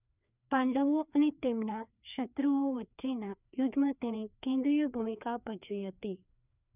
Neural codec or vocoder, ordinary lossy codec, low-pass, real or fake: codec, 16 kHz, 2 kbps, FreqCodec, larger model; none; 3.6 kHz; fake